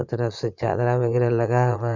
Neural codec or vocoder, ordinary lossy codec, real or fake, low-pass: vocoder, 22.05 kHz, 80 mel bands, Vocos; Opus, 64 kbps; fake; 7.2 kHz